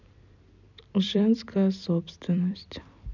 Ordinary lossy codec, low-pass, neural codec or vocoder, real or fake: none; 7.2 kHz; vocoder, 44.1 kHz, 128 mel bands every 256 samples, BigVGAN v2; fake